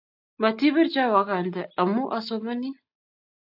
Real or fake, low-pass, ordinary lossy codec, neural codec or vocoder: real; 5.4 kHz; AAC, 48 kbps; none